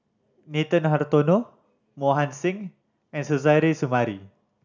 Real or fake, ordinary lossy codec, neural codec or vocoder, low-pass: real; none; none; 7.2 kHz